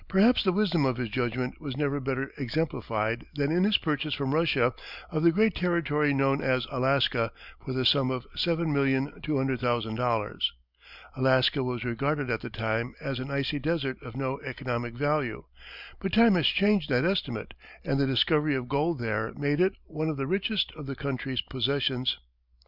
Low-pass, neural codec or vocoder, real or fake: 5.4 kHz; none; real